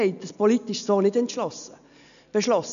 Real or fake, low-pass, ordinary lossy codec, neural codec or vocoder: real; 7.2 kHz; none; none